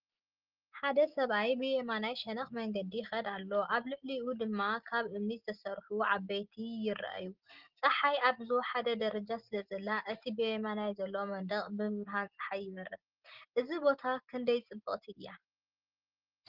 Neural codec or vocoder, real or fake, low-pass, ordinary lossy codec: none; real; 5.4 kHz; Opus, 16 kbps